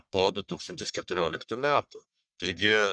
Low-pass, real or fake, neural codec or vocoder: 9.9 kHz; fake; codec, 44.1 kHz, 1.7 kbps, Pupu-Codec